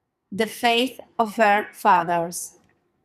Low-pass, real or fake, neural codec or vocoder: 14.4 kHz; fake; codec, 44.1 kHz, 2.6 kbps, SNAC